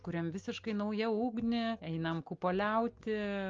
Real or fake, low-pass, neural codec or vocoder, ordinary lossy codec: real; 7.2 kHz; none; Opus, 32 kbps